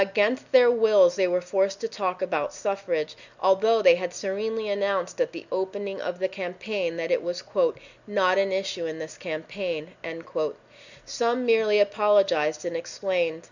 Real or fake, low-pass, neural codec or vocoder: real; 7.2 kHz; none